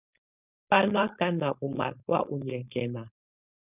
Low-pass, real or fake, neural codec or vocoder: 3.6 kHz; fake; codec, 16 kHz, 4.8 kbps, FACodec